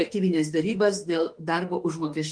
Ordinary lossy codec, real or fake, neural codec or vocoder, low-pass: Opus, 24 kbps; fake; autoencoder, 48 kHz, 32 numbers a frame, DAC-VAE, trained on Japanese speech; 9.9 kHz